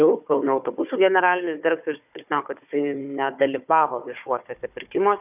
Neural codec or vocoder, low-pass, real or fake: codec, 16 kHz, 4 kbps, FunCodec, trained on Chinese and English, 50 frames a second; 3.6 kHz; fake